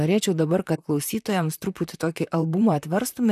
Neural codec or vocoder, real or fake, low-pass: vocoder, 44.1 kHz, 128 mel bands, Pupu-Vocoder; fake; 14.4 kHz